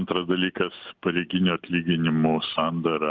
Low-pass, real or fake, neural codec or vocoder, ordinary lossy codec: 7.2 kHz; real; none; Opus, 32 kbps